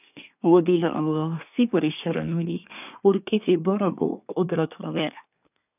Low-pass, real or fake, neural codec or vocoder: 3.6 kHz; fake; codec, 24 kHz, 1 kbps, SNAC